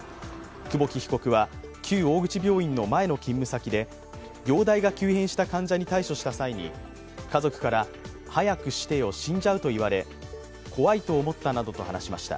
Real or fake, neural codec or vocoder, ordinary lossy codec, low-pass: real; none; none; none